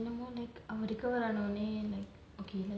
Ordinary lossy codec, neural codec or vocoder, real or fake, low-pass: none; none; real; none